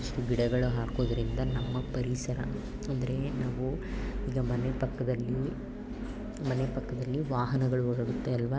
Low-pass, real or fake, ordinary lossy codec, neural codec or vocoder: none; real; none; none